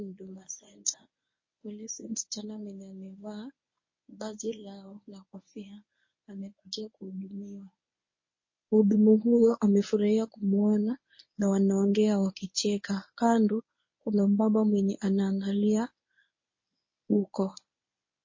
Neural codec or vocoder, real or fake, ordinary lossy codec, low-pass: codec, 24 kHz, 0.9 kbps, WavTokenizer, medium speech release version 1; fake; MP3, 32 kbps; 7.2 kHz